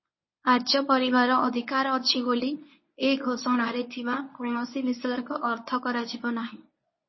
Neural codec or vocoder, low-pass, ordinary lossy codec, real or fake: codec, 24 kHz, 0.9 kbps, WavTokenizer, medium speech release version 1; 7.2 kHz; MP3, 24 kbps; fake